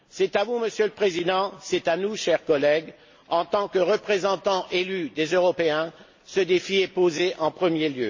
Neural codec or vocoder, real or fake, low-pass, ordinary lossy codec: none; real; 7.2 kHz; MP3, 32 kbps